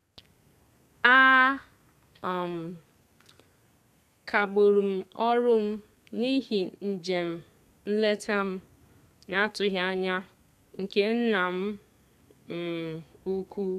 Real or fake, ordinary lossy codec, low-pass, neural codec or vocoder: fake; none; 14.4 kHz; codec, 32 kHz, 1.9 kbps, SNAC